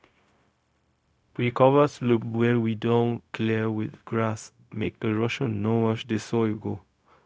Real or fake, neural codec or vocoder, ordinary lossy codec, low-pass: fake; codec, 16 kHz, 0.4 kbps, LongCat-Audio-Codec; none; none